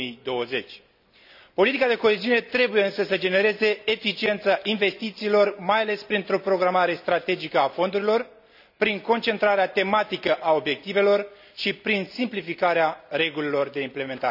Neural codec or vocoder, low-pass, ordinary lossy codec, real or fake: none; 5.4 kHz; none; real